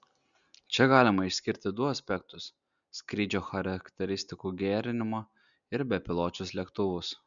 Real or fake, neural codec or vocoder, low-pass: real; none; 7.2 kHz